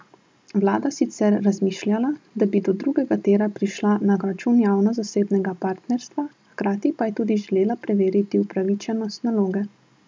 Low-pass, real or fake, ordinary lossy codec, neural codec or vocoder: none; real; none; none